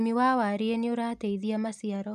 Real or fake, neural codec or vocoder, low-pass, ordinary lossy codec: real; none; 14.4 kHz; none